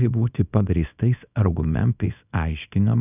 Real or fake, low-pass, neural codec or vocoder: fake; 3.6 kHz; codec, 24 kHz, 0.9 kbps, WavTokenizer, small release